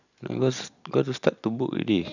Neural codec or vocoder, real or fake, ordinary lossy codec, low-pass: none; real; none; 7.2 kHz